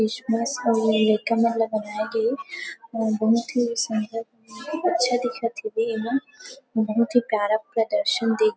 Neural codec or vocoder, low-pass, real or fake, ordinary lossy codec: none; none; real; none